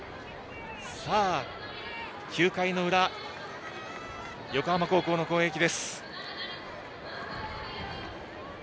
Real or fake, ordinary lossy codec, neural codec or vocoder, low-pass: real; none; none; none